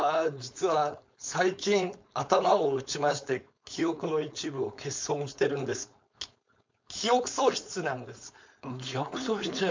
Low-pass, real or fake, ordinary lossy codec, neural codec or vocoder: 7.2 kHz; fake; MP3, 64 kbps; codec, 16 kHz, 4.8 kbps, FACodec